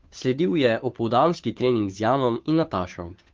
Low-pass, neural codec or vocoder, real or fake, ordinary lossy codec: 7.2 kHz; codec, 16 kHz, 2 kbps, FunCodec, trained on Chinese and English, 25 frames a second; fake; Opus, 32 kbps